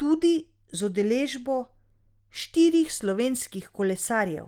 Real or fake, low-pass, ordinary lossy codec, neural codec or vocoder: real; 19.8 kHz; Opus, 24 kbps; none